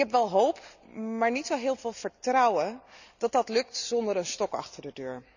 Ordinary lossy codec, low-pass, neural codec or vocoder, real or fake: none; 7.2 kHz; none; real